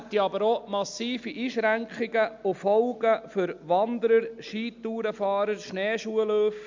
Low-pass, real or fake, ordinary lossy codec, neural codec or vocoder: 7.2 kHz; real; MP3, 64 kbps; none